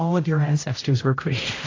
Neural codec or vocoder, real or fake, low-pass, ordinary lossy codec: codec, 16 kHz, 0.5 kbps, X-Codec, HuBERT features, trained on general audio; fake; 7.2 kHz; AAC, 32 kbps